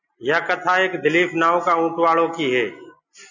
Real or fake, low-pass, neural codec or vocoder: real; 7.2 kHz; none